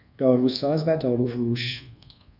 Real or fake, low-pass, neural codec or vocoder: fake; 5.4 kHz; codec, 24 kHz, 1.2 kbps, DualCodec